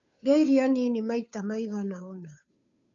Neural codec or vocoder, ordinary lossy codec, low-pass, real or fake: codec, 16 kHz, 2 kbps, FunCodec, trained on Chinese and English, 25 frames a second; none; 7.2 kHz; fake